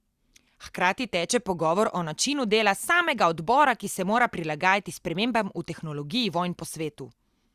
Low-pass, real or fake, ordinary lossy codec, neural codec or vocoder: 14.4 kHz; real; Opus, 64 kbps; none